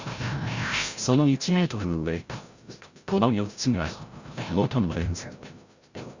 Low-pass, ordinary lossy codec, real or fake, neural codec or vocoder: 7.2 kHz; Opus, 64 kbps; fake; codec, 16 kHz, 0.5 kbps, FreqCodec, larger model